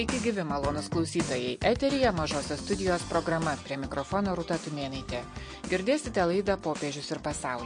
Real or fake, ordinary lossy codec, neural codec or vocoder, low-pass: real; MP3, 48 kbps; none; 9.9 kHz